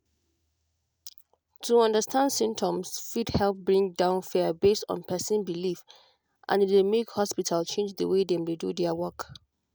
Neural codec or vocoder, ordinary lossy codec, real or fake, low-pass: none; none; real; none